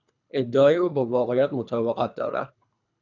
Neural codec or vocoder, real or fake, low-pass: codec, 24 kHz, 3 kbps, HILCodec; fake; 7.2 kHz